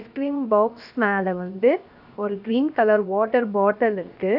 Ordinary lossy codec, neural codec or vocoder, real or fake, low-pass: AAC, 48 kbps; codec, 16 kHz, 0.7 kbps, FocalCodec; fake; 5.4 kHz